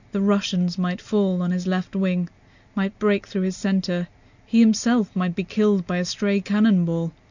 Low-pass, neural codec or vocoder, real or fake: 7.2 kHz; none; real